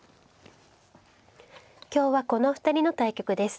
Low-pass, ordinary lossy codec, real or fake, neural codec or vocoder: none; none; real; none